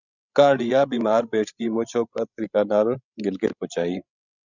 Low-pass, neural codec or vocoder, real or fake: 7.2 kHz; codec, 16 kHz, 16 kbps, FreqCodec, larger model; fake